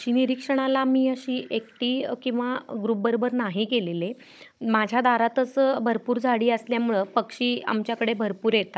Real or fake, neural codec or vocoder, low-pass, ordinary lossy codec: fake; codec, 16 kHz, 16 kbps, FunCodec, trained on Chinese and English, 50 frames a second; none; none